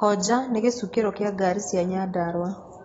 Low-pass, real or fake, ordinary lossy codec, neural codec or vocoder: 19.8 kHz; real; AAC, 24 kbps; none